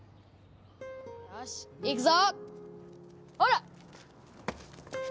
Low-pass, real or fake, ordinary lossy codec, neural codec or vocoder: none; real; none; none